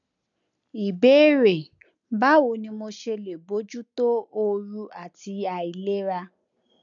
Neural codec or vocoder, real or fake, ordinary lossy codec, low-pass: none; real; none; 7.2 kHz